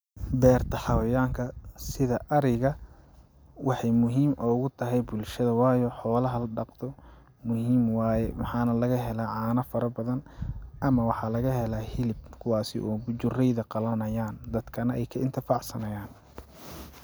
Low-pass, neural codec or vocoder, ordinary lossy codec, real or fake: none; none; none; real